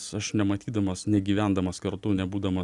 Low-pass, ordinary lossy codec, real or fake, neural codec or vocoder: 10.8 kHz; Opus, 64 kbps; real; none